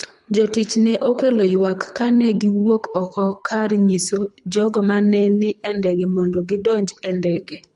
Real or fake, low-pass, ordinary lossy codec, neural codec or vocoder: fake; 10.8 kHz; MP3, 64 kbps; codec, 24 kHz, 3 kbps, HILCodec